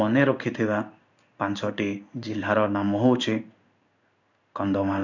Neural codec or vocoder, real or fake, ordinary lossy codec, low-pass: codec, 16 kHz in and 24 kHz out, 1 kbps, XY-Tokenizer; fake; none; 7.2 kHz